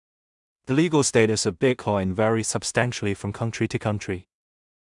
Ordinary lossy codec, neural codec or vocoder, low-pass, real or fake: none; codec, 16 kHz in and 24 kHz out, 0.4 kbps, LongCat-Audio-Codec, two codebook decoder; 10.8 kHz; fake